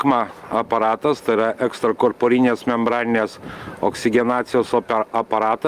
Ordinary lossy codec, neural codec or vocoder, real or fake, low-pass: Opus, 32 kbps; none; real; 14.4 kHz